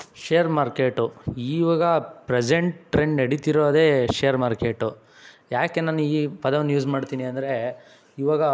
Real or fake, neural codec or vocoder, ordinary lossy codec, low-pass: real; none; none; none